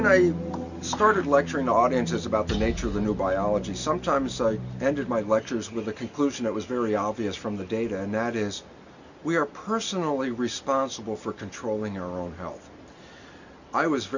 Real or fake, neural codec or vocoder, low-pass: real; none; 7.2 kHz